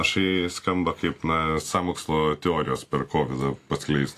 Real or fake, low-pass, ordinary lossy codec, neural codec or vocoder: real; 14.4 kHz; AAC, 64 kbps; none